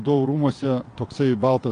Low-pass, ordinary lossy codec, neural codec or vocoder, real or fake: 9.9 kHz; AAC, 48 kbps; vocoder, 22.05 kHz, 80 mel bands, WaveNeXt; fake